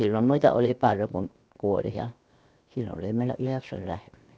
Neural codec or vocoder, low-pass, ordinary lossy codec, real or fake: codec, 16 kHz, 0.7 kbps, FocalCodec; none; none; fake